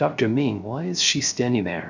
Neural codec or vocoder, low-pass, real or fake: codec, 16 kHz, 0.3 kbps, FocalCodec; 7.2 kHz; fake